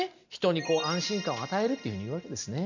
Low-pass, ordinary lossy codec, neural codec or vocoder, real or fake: 7.2 kHz; none; none; real